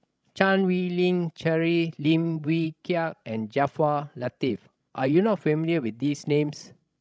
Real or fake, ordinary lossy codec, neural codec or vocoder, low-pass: fake; none; codec, 16 kHz, 16 kbps, FreqCodec, larger model; none